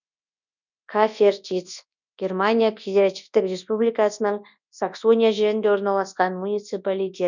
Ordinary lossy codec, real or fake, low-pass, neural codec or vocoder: none; fake; 7.2 kHz; codec, 24 kHz, 0.9 kbps, WavTokenizer, large speech release